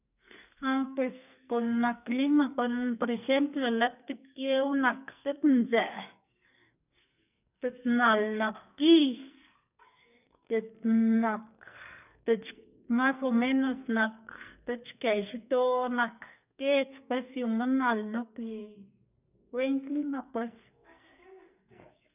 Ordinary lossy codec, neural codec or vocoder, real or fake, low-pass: none; codec, 44.1 kHz, 2.6 kbps, SNAC; fake; 3.6 kHz